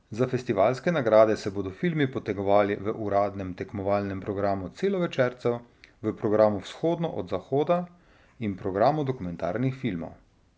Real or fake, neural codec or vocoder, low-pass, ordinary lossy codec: real; none; none; none